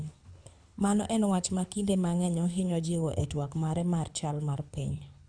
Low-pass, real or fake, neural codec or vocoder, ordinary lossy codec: 9.9 kHz; fake; codec, 24 kHz, 6 kbps, HILCodec; none